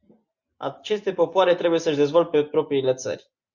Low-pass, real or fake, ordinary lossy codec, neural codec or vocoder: 7.2 kHz; real; Opus, 64 kbps; none